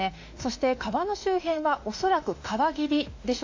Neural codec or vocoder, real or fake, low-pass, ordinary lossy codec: autoencoder, 48 kHz, 32 numbers a frame, DAC-VAE, trained on Japanese speech; fake; 7.2 kHz; AAC, 48 kbps